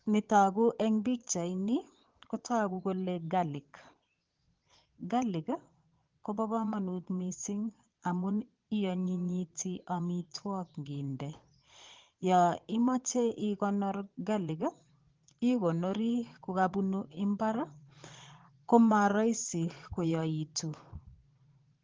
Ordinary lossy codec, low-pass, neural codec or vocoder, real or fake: Opus, 16 kbps; 7.2 kHz; vocoder, 22.05 kHz, 80 mel bands, Vocos; fake